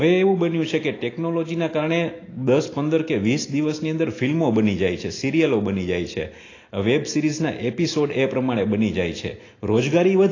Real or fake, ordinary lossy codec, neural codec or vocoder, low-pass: real; AAC, 32 kbps; none; 7.2 kHz